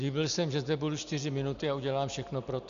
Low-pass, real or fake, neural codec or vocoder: 7.2 kHz; real; none